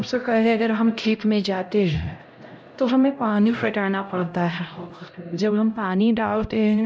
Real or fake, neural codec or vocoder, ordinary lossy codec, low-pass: fake; codec, 16 kHz, 0.5 kbps, X-Codec, HuBERT features, trained on LibriSpeech; none; none